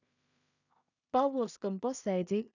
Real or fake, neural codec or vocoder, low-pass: fake; codec, 16 kHz in and 24 kHz out, 0.4 kbps, LongCat-Audio-Codec, two codebook decoder; 7.2 kHz